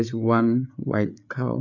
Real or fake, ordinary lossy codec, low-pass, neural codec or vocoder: fake; none; 7.2 kHz; codec, 16 kHz, 8 kbps, FreqCodec, larger model